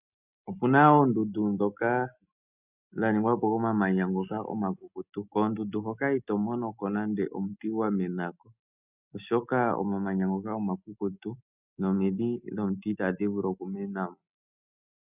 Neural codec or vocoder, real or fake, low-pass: none; real; 3.6 kHz